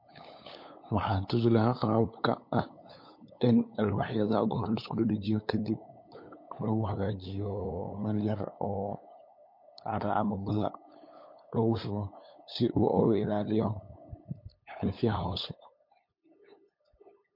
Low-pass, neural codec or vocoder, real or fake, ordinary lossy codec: 5.4 kHz; codec, 16 kHz, 8 kbps, FunCodec, trained on LibriTTS, 25 frames a second; fake; MP3, 32 kbps